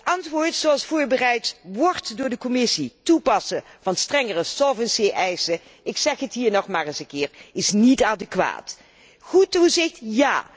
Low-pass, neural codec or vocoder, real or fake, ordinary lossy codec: none; none; real; none